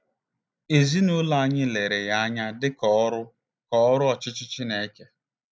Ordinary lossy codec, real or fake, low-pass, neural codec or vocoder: none; real; none; none